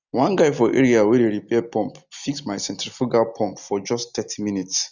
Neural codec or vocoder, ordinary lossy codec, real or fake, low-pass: none; none; real; 7.2 kHz